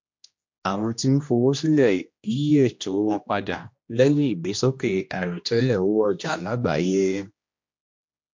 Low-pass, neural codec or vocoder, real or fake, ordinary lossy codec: 7.2 kHz; codec, 16 kHz, 1 kbps, X-Codec, HuBERT features, trained on general audio; fake; MP3, 48 kbps